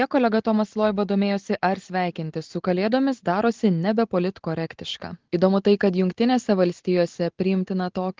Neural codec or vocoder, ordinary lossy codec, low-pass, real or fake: none; Opus, 16 kbps; 7.2 kHz; real